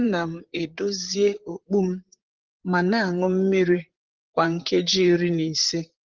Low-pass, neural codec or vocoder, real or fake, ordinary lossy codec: 7.2 kHz; vocoder, 44.1 kHz, 128 mel bands, Pupu-Vocoder; fake; Opus, 16 kbps